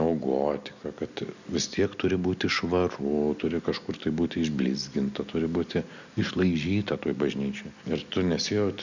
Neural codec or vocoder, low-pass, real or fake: none; 7.2 kHz; real